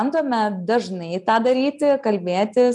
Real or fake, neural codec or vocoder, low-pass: real; none; 10.8 kHz